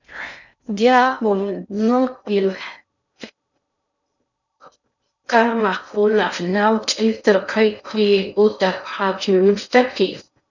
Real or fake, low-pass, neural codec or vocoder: fake; 7.2 kHz; codec, 16 kHz in and 24 kHz out, 0.6 kbps, FocalCodec, streaming, 2048 codes